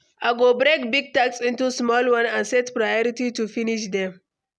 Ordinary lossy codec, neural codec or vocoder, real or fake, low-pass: none; none; real; none